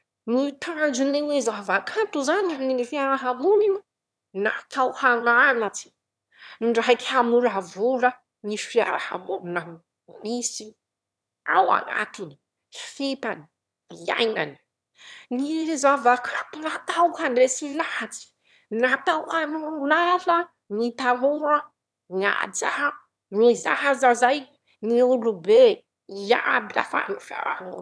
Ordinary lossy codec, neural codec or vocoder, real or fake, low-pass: none; autoencoder, 22.05 kHz, a latent of 192 numbers a frame, VITS, trained on one speaker; fake; none